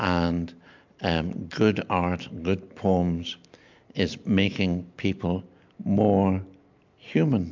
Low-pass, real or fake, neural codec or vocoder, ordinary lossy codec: 7.2 kHz; real; none; MP3, 64 kbps